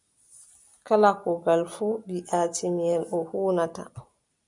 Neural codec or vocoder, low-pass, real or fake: none; 10.8 kHz; real